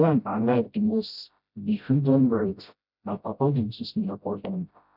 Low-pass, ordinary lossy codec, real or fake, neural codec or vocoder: 5.4 kHz; none; fake; codec, 16 kHz, 0.5 kbps, FreqCodec, smaller model